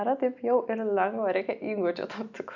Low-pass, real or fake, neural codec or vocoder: 7.2 kHz; real; none